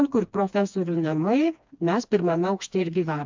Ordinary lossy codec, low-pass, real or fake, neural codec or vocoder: MP3, 64 kbps; 7.2 kHz; fake; codec, 16 kHz, 2 kbps, FreqCodec, smaller model